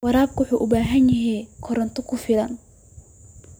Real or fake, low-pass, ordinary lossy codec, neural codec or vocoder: real; none; none; none